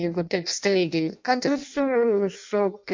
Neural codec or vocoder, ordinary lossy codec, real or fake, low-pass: codec, 16 kHz in and 24 kHz out, 0.6 kbps, FireRedTTS-2 codec; MP3, 64 kbps; fake; 7.2 kHz